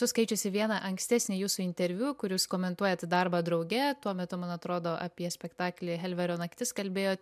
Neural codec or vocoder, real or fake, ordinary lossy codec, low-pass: none; real; MP3, 96 kbps; 14.4 kHz